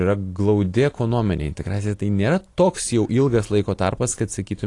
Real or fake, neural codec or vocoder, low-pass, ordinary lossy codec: real; none; 10.8 kHz; AAC, 48 kbps